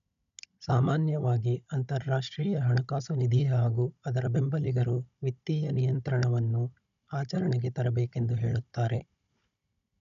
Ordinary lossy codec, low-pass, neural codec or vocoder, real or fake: none; 7.2 kHz; codec, 16 kHz, 16 kbps, FunCodec, trained on Chinese and English, 50 frames a second; fake